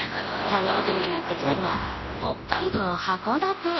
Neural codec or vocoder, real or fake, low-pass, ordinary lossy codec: codec, 24 kHz, 0.9 kbps, WavTokenizer, large speech release; fake; 7.2 kHz; MP3, 24 kbps